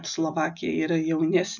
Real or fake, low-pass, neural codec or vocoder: real; 7.2 kHz; none